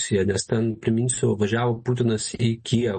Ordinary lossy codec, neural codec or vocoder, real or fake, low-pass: MP3, 32 kbps; none; real; 9.9 kHz